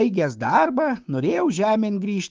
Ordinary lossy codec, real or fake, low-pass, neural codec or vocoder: Opus, 32 kbps; fake; 7.2 kHz; codec, 16 kHz, 6 kbps, DAC